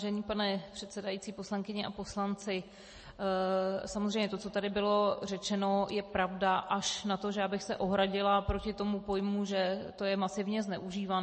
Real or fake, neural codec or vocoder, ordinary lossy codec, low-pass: real; none; MP3, 32 kbps; 9.9 kHz